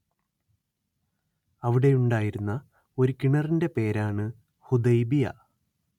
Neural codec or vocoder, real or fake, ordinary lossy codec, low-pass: none; real; MP3, 96 kbps; 19.8 kHz